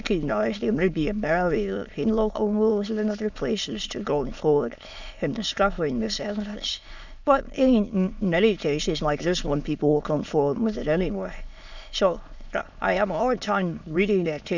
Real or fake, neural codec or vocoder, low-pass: fake; autoencoder, 22.05 kHz, a latent of 192 numbers a frame, VITS, trained on many speakers; 7.2 kHz